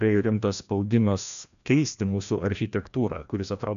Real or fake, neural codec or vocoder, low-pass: fake; codec, 16 kHz, 1 kbps, FreqCodec, larger model; 7.2 kHz